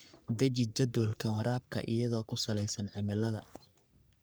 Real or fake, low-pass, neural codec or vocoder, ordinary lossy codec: fake; none; codec, 44.1 kHz, 3.4 kbps, Pupu-Codec; none